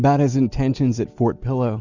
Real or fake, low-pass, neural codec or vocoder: real; 7.2 kHz; none